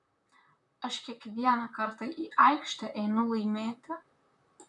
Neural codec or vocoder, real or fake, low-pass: vocoder, 44.1 kHz, 128 mel bands, Pupu-Vocoder; fake; 10.8 kHz